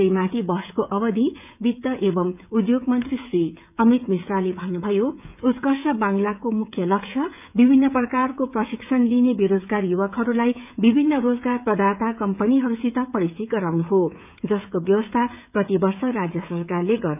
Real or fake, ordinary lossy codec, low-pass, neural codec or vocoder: fake; none; 3.6 kHz; codec, 16 kHz, 16 kbps, FreqCodec, smaller model